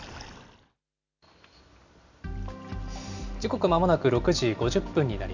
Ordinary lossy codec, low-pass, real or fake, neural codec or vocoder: none; 7.2 kHz; real; none